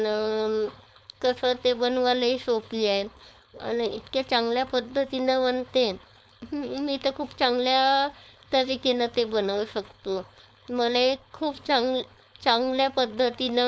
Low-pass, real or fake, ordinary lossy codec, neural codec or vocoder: none; fake; none; codec, 16 kHz, 4.8 kbps, FACodec